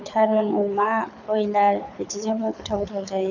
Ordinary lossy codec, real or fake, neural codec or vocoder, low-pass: none; fake; codec, 24 kHz, 6 kbps, HILCodec; 7.2 kHz